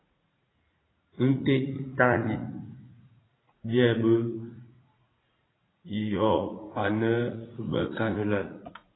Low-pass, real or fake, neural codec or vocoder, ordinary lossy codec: 7.2 kHz; fake; vocoder, 44.1 kHz, 80 mel bands, Vocos; AAC, 16 kbps